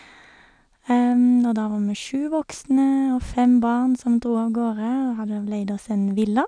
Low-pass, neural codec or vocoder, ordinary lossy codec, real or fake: 9.9 kHz; none; none; real